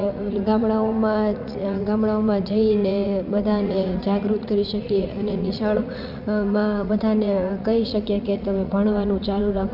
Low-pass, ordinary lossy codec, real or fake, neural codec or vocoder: 5.4 kHz; none; fake; vocoder, 44.1 kHz, 80 mel bands, Vocos